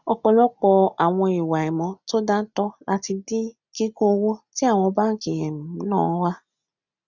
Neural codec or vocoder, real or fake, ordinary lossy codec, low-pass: none; real; none; 7.2 kHz